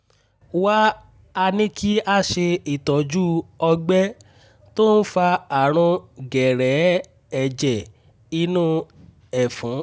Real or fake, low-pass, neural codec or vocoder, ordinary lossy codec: real; none; none; none